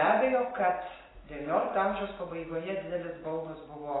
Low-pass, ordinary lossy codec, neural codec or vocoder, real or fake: 7.2 kHz; AAC, 16 kbps; none; real